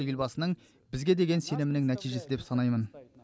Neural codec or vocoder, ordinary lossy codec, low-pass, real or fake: none; none; none; real